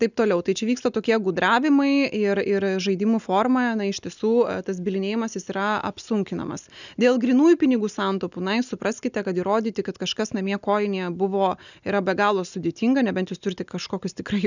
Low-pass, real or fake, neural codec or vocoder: 7.2 kHz; real; none